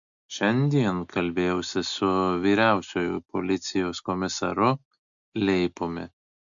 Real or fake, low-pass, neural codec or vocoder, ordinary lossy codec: real; 7.2 kHz; none; MP3, 64 kbps